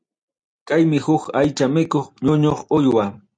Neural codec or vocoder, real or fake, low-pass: none; real; 9.9 kHz